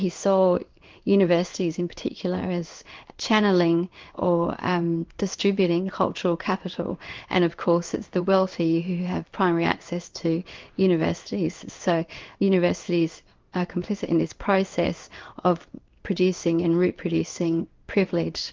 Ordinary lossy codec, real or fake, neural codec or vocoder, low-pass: Opus, 24 kbps; fake; codec, 16 kHz in and 24 kHz out, 1 kbps, XY-Tokenizer; 7.2 kHz